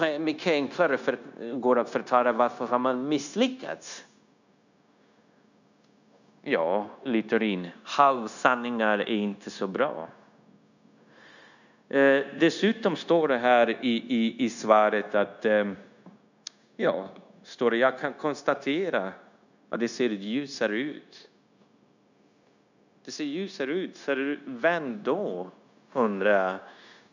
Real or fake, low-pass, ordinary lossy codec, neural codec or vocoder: fake; 7.2 kHz; none; codec, 16 kHz, 0.9 kbps, LongCat-Audio-Codec